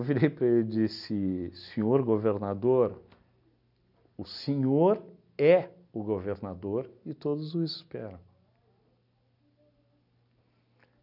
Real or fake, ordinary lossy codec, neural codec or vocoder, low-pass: real; none; none; 5.4 kHz